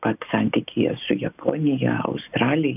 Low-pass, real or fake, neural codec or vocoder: 3.6 kHz; real; none